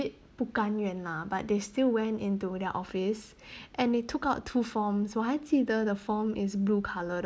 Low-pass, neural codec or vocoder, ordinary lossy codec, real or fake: none; none; none; real